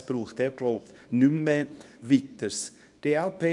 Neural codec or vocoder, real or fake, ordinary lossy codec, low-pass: codec, 24 kHz, 0.9 kbps, WavTokenizer, small release; fake; none; 10.8 kHz